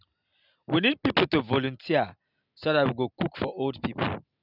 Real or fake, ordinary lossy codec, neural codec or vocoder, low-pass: real; none; none; 5.4 kHz